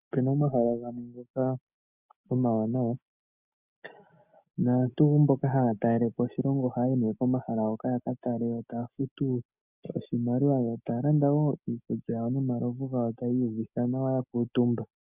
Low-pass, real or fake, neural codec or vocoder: 3.6 kHz; real; none